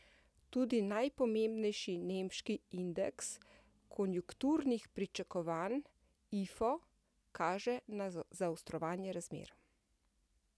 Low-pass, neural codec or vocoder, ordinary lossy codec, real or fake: none; none; none; real